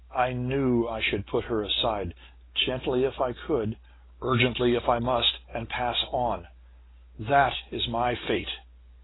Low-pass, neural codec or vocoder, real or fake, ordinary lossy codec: 7.2 kHz; none; real; AAC, 16 kbps